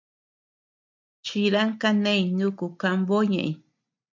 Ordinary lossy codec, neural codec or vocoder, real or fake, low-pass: MP3, 64 kbps; none; real; 7.2 kHz